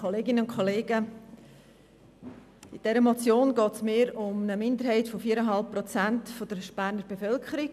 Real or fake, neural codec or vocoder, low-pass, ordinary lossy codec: real; none; 14.4 kHz; none